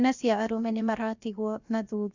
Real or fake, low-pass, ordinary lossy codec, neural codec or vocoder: fake; 7.2 kHz; Opus, 64 kbps; codec, 16 kHz, 0.7 kbps, FocalCodec